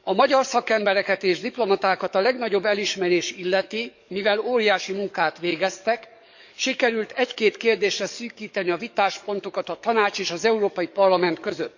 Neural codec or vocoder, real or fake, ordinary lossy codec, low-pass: codec, 44.1 kHz, 7.8 kbps, DAC; fake; none; 7.2 kHz